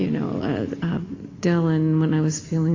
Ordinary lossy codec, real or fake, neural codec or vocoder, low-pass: AAC, 32 kbps; real; none; 7.2 kHz